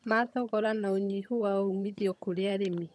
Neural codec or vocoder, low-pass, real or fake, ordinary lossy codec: vocoder, 22.05 kHz, 80 mel bands, HiFi-GAN; none; fake; none